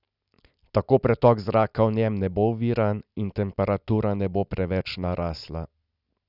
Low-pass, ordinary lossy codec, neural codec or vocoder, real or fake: 5.4 kHz; none; none; real